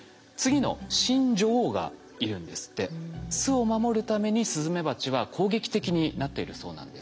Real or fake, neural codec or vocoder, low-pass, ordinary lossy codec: real; none; none; none